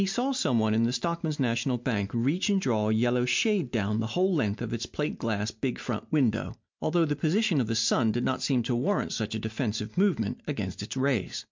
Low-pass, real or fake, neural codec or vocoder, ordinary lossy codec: 7.2 kHz; real; none; MP3, 64 kbps